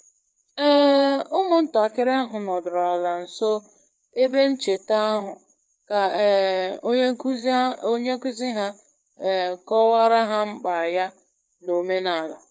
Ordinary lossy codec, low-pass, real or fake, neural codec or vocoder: none; none; fake; codec, 16 kHz, 4 kbps, FreqCodec, larger model